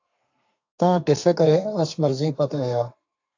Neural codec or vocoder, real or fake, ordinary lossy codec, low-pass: codec, 32 kHz, 1.9 kbps, SNAC; fake; AAC, 48 kbps; 7.2 kHz